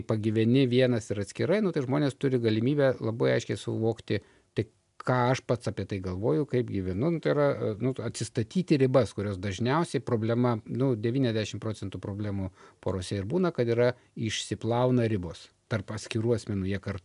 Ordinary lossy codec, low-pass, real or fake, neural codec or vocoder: AAC, 96 kbps; 10.8 kHz; real; none